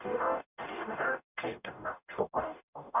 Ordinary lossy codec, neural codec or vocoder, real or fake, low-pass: none; codec, 44.1 kHz, 0.9 kbps, DAC; fake; 3.6 kHz